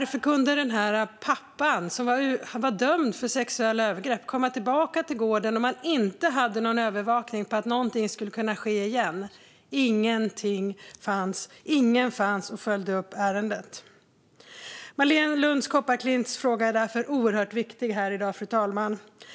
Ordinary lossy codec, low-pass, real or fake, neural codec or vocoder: none; none; real; none